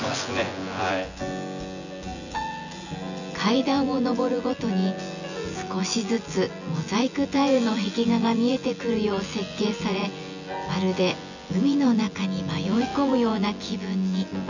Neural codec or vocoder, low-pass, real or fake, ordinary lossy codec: vocoder, 24 kHz, 100 mel bands, Vocos; 7.2 kHz; fake; none